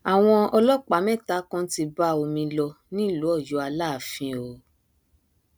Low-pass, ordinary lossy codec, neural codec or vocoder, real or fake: none; none; none; real